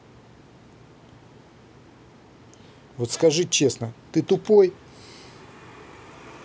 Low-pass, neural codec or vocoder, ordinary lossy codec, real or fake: none; none; none; real